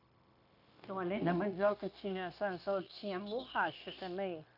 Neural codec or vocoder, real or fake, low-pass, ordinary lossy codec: codec, 16 kHz, 0.9 kbps, LongCat-Audio-Codec; fake; 5.4 kHz; none